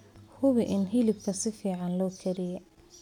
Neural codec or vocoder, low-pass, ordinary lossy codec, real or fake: none; 19.8 kHz; none; real